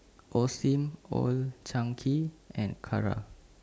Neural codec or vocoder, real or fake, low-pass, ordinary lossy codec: none; real; none; none